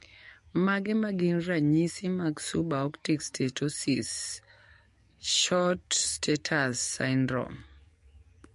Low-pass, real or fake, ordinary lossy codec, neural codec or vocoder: 14.4 kHz; fake; MP3, 48 kbps; codec, 44.1 kHz, 7.8 kbps, DAC